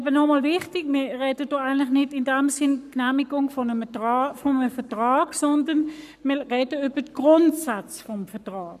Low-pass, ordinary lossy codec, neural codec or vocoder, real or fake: 14.4 kHz; none; codec, 44.1 kHz, 7.8 kbps, Pupu-Codec; fake